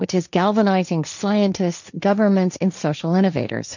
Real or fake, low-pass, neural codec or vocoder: fake; 7.2 kHz; codec, 16 kHz, 1.1 kbps, Voila-Tokenizer